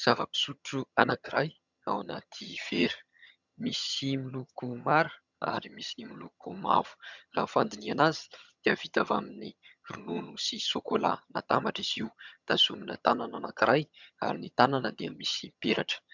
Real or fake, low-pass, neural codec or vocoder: fake; 7.2 kHz; vocoder, 22.05 kHz, 80 mel bands, HiFi-GAN